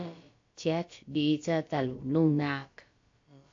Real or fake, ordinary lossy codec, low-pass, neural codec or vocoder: fake; AAC, 48 kbps; 7.2 kHz; codec, 16 kHz, about 1 kbps, DyCAST, with the encoder's durations